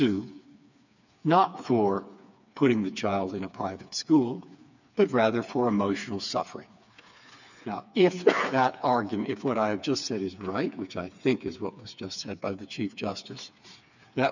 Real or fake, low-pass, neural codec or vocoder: fake; 7.2 kHz; codec, 16 kHz, 4 kbps, FreqCodec, smaller model